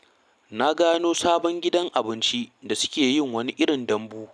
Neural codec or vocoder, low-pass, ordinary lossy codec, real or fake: none; none; none; real